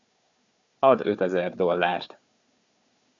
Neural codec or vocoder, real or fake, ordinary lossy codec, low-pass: codec, 16 kHz, 4 kbps, FunCodec, trained on Chinese and English, 50 frames a second; fake; AAC, 64 kbps; 7.2 kHz